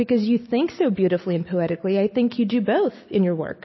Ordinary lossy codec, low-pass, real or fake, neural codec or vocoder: MP3, 24 kbps; 7.2 kHz; real; none